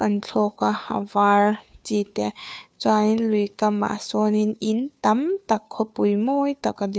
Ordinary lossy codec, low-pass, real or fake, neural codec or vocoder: none; none; fake; codec, 16 kHz, 2 kbps, FunCodec, trained on Chinese and English, 25 frames a second